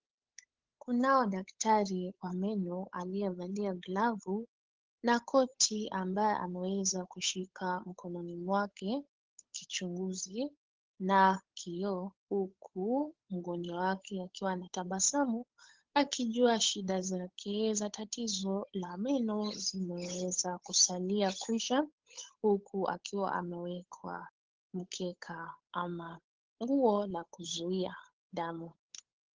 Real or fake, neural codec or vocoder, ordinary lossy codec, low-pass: fake; codec, 16 kHz, 8 kbps, FunCodec, trained on Chinese and English, 25 frames a second; Opus, 16 kbps; 7.2 kHz